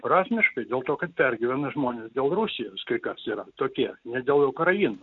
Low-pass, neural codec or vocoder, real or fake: 7.2 kHz; none; real